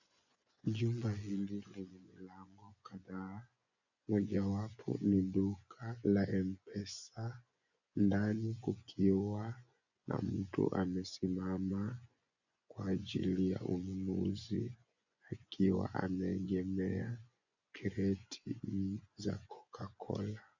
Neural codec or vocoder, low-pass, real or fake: vocoder, 24 kHz, 100 mel bands, Vocos; 7.2 kHz; fake